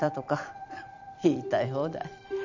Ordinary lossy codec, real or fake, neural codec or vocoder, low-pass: none; fake; vocoder, 44.1 kHz, 128 mel bands every 256 samples, BigVGAN v2; 7.2 kHz